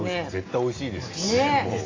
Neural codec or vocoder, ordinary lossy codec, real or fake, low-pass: none; AAC, 32 kbps; real; 7.2 kHz